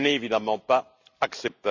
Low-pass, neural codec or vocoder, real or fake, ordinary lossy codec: 7.2 kHz; none; real; Opus, 64 kbps